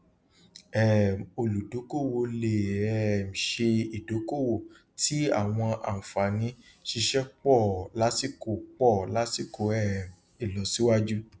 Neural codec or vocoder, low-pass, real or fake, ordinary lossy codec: none; none; real; none